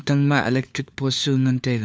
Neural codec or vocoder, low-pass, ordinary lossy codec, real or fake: codec, 16 kHz, 4 kbps, FunCodec, trained on LibriTTS, 50 frames a second; none; none; fake